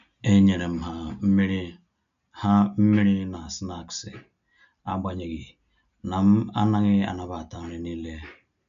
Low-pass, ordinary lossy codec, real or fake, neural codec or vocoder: 7.2 kHz; none; real; none